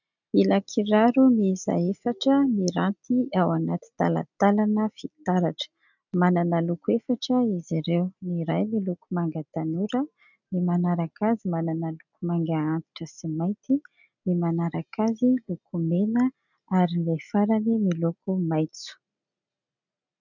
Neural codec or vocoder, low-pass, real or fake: none; 7.2 kHz; real